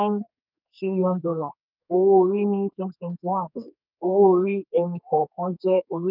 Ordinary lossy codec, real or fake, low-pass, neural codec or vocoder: none; fake; 5.4 kHz; codec, 32 kHz, 1.9 kbps, SNAC